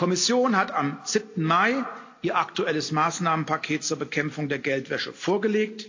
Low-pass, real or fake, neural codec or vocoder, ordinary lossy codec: 7.2 kHz; real; none; AAC, 48 kbps